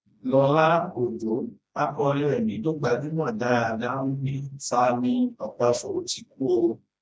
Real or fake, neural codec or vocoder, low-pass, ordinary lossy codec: fake; codec, 16 kHz, 1 kbps, FreqCodec, smaller model; none; none